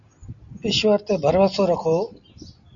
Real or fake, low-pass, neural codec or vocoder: real; 7.2 kHz; none